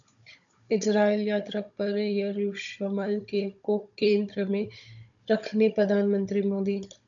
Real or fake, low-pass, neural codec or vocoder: fake; 7.2 kHz; codec, 16 kHz, 16 kbps, FunCodec, trained on Chinese and English, 50 frames a second